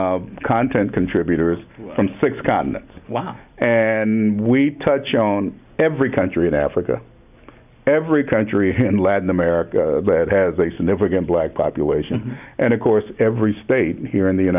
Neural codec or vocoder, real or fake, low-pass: none; real; 3.6 kHz